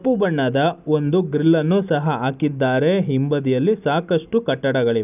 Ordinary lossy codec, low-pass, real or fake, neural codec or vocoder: none; 3.6 kHz; real; none